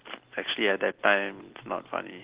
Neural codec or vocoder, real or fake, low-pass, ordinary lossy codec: none; real; 3.6 kHz; Opus, 16 kbps